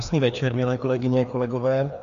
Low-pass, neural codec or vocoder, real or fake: 7.2 kHz; codec, 16 kHz, 2 kbps, FreqCodec, larger model; fake